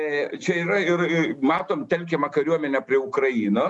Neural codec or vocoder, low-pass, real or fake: none; 10.8 kHz; real